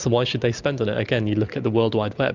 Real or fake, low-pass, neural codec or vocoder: real; 7.2 kHz; none